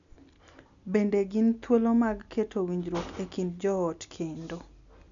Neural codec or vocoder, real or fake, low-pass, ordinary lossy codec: none; real; 7.2 kHz; none